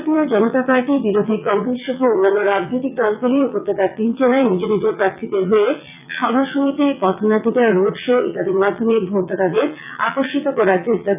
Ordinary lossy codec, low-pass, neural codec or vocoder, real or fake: none; 3.6 kHz; vocoder, 22.05 kHz, 80 mel bands, WaveNeXt; fake